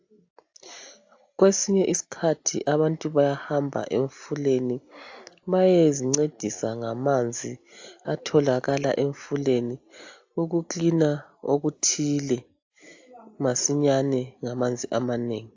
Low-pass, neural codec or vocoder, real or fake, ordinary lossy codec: 7.2 kHz; none; real; AAC, 48 kbps